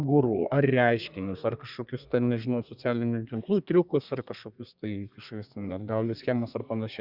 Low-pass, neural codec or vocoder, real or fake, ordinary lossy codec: 5.4 kHz; codec, 32 kHz, 1.9 kbps, SNAC; fake; Opus, 64 kbps